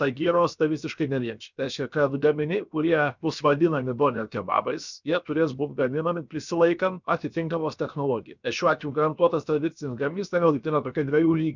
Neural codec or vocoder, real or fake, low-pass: codec, 16 kHz, 0.7 kbps, FocalCodec; fake; 7.2 kHz